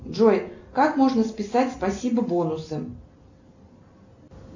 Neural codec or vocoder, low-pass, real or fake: none; 7.2 kHz; real